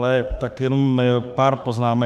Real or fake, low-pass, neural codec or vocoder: fake; 14.4 kHz; autoencoder, 48 kHz, 32 numbers a frame, DAC-VAE, trained on Japanese speech